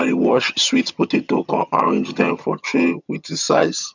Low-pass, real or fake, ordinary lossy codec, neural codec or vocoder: 7.2 kHz; fake; none; vocoder, 22.05 kHz, 80 mel bands, HiFi-GAN